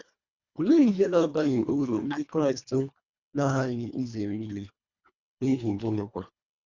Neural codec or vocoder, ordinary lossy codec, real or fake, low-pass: codec, 24 kHz, 1.5 kbps, HILCodec; Opus, 64 kbps; fake; 7.2 kHz